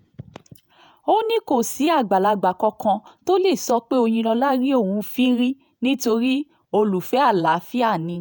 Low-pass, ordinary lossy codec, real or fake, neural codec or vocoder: none; none; fake; vocoder, 48 kHz, 128 mel bands, Vocos